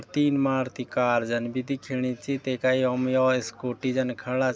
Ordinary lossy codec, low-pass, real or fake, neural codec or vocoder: none; none; real; none